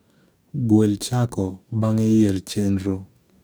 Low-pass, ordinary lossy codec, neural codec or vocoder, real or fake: none; none; codec, 44.1 kHz, 2.6 kbps, DAC; fake